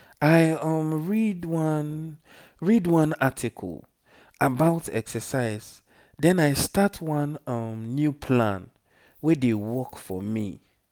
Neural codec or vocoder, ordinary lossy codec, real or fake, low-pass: none; none; real; none